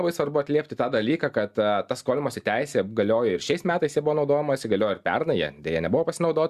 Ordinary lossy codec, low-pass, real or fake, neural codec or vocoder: Opus, 64 kbps; 14.4 kHz; real; none